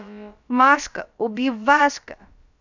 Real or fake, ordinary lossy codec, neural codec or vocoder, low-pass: fake; none; codec, 16 kHz, about 1 kbps, DyCAST, with the encoder's durations; 7.2 kHz